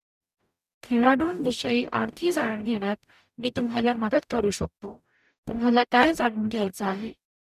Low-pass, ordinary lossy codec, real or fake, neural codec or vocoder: 14.4 kHz; none; fake; codec, 44.1 kHz, 0.9 kbps, DAC